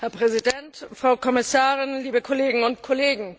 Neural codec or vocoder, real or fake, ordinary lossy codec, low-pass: none; real; none; none